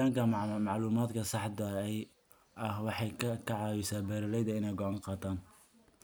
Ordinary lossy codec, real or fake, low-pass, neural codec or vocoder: none; real; none; none